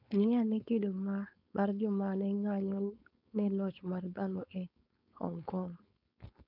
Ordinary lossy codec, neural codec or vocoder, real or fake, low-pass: none; codec, 16 kHz, 4.8 kbps, FACodec; fake; 5.4 kHz